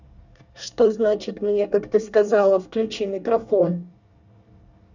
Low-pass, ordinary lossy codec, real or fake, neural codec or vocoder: 7.2 kHz; none; fake; codec, 24 kHz, 1 kbps, SNAC